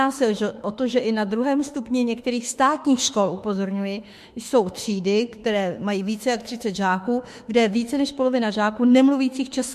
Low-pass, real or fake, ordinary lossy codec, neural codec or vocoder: 14.4 kHz; fake; MP3, 64 kbps; autoencoder, 48 kHz, 32 numbers a frame, DAC-VAE, trained on Japanese speech